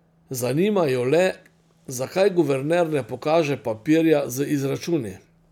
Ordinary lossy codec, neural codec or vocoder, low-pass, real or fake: none; none; 19.8 kHz; real